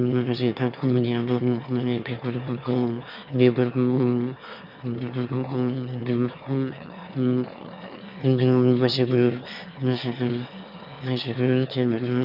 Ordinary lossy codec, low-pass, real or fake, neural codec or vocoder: none; 5.4 kHz; fake; autoencoder, 22.05 kHz, a latent of 192 numbers a frame, VITS, trained on one speaker